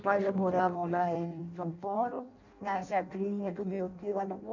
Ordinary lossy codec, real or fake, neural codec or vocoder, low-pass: none; fake; codec, 16 kHz in and 24 kHz out, 0.6 kbps, FireRedTTS-2 codec; 7.2 kHz